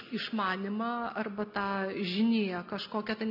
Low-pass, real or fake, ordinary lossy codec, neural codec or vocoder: 5.4 kHz; real; MP3, 48 kbps; none